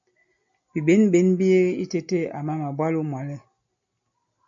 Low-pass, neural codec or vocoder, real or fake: 7.2 kHz; none; real